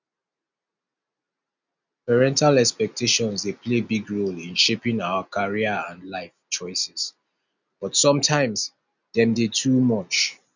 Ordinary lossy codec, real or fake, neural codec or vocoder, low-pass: none; real; none; 7.2 kHz